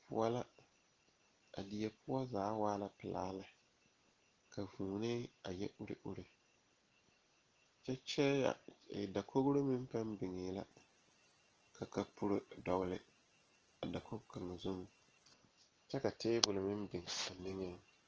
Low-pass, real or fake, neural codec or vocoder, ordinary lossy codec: 7.2 kHz; real; none; Opus, 16 kbps